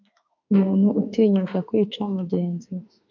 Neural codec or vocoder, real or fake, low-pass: autoencoder, 48 kHz, 32 numbers a frame, DAC-VAE, trained on Japanese speech; fake; 7.2 kHz